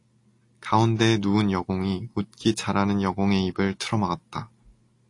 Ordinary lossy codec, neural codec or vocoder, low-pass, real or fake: AAC, 48 kbps; none; 10.8 kHz; real